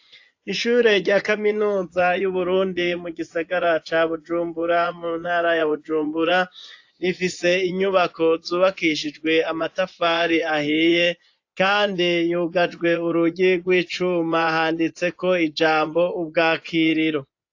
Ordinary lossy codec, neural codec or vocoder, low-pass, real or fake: AAC, 48 kbps; vocoder, 24 kHz, 100 mel bands, Vocos; 7.2 kHz; fake